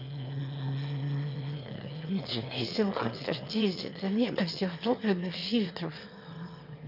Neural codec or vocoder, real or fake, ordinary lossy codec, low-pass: autoencoder, 22.05 kHz, a latent of 192 numbers a frame, VITS, trained on one speaker; fake; none; 5.4 kHz